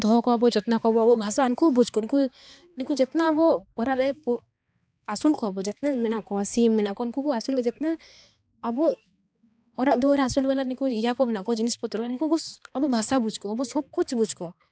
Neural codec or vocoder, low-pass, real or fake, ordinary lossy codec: codec, 16 kHz, 2 kbps, X-Codec, HuBERT features, trained on balanced general audio; none; fake; none